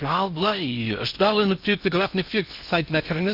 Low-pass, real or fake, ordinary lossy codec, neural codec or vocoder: 5.4 kHz; fake; none; codec, 16 kHz in and 24 kHz out, 0.6 kbps, FocalCodec, streaming, 4096 codes